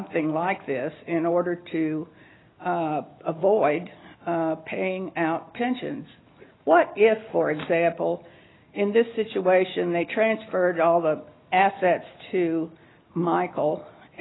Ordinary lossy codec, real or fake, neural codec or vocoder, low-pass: AAC, 16 kbps; real; none; 7.2 kHz